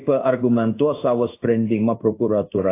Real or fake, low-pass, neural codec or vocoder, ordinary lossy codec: fake; 3.6 kHz; codec, 16 kHz in and 24 kHz out, 1 kbps, XY-Tokenizer; AAC, 24 kbps